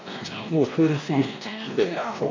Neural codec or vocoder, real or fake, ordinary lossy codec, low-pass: codec, 16 kHz, 1 kbps, X-Codec, WavLM features, trained on Multilingual LibriSpeech; fake; MP3, 64 kbps; 7.2 kHz